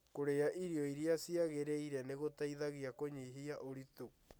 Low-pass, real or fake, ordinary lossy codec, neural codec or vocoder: none; real; none; none